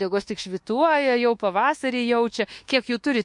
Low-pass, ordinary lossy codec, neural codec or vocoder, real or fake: 10.8 kHz; MP3, 48 kbps; codec, 24 kHz, 1.2 kbps, DualCodec; fake